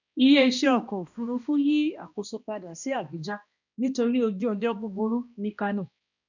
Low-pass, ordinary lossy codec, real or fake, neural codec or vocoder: 7.2 kHz; none; fake; codec, 16 kHz, 1 kbps, X-Codec, HuBERT features, trained on balanced general audio